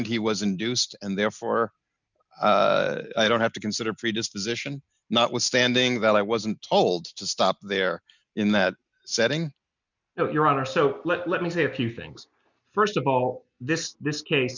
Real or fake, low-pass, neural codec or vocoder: real; 7.2 kHz; none